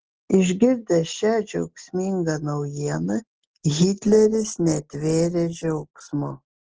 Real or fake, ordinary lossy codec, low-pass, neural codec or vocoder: real; Opus, 16 kbps; 7.2 kHz; none